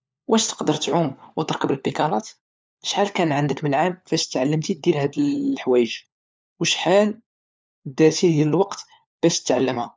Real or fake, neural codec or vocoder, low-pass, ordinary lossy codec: fake; codec, 16 kHz, 4 kbps, FunCodec, trained on LibriTTS, 50 frames a second; none; none